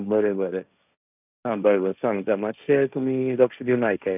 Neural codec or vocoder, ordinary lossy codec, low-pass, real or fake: codec, 16 kHz, 1.1 kbps, Voila-Tokenizer; none; 3.6 kHz; fake